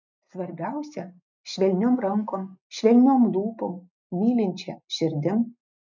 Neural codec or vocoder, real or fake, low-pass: none; real; 7.2 kHz